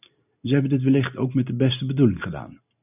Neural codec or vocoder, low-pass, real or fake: none; 3.6 kHz; real